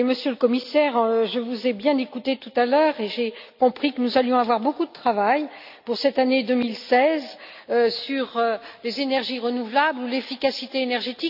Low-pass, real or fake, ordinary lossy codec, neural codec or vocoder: 5.4 kHz; real; none; none